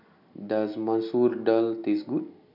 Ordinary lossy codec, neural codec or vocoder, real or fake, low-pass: none; none; real; 5.4 kHz